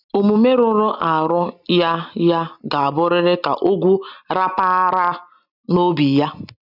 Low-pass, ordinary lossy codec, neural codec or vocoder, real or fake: 5.4 kHz; none; none; real